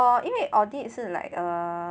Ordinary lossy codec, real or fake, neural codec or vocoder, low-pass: none; real; none; none